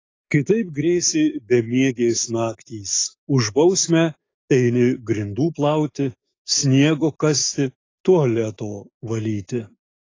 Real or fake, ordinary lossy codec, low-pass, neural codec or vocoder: fake; AAC, 32 kbps; 7.2 kHz; codec, 44.1 kHz, 7.8 kbps, DAC